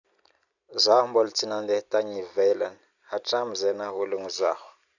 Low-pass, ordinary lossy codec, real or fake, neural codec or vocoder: 7.2 kHz; none; real; none